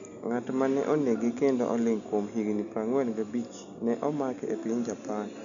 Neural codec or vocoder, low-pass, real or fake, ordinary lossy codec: none; 7.2 kHz; real; none